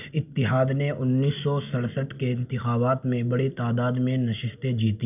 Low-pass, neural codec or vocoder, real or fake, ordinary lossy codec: 3.6 kHz; none; real; none